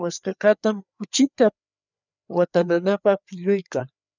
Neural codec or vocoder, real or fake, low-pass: codec, 16 kHz, 4 kbps, FreqCodec, larger model; fake; 7.2 kHz